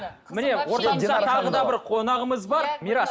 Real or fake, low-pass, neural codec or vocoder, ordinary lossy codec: real; none; none; none